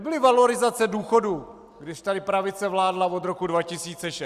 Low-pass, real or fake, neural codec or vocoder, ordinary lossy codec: 14.4 kHz; real; none; Opus, 64 kbps